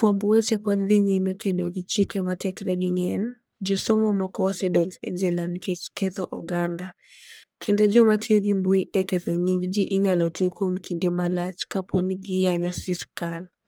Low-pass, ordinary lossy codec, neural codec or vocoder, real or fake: none; none; codec, 44.1 kHz, 1.7 kbps, Pupu-Codec; fake